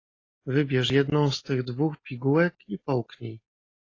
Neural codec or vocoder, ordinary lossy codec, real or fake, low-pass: none; AAC, 32 kbps; real; 7.2 kHz